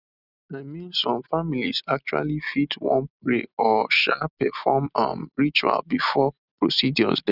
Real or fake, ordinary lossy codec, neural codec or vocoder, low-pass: real; none; none; 5.4 kHz